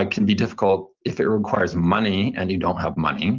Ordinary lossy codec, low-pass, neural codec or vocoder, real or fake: Opus, 32 kbps; 7.2 kHz; none; real